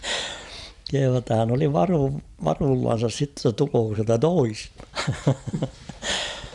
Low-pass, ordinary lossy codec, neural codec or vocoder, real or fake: 10.8 kHz; none; none; real